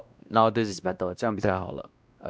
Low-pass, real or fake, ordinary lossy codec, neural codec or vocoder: none; fake; none; codec, 16 kHz, 1 kbps, X-Codec, WavLM features, trained on Multilingual LibriSpeech